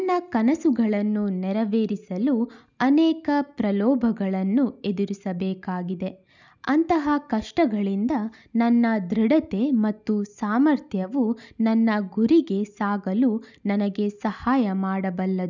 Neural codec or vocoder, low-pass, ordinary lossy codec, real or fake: none; 7.2 kHz; none; real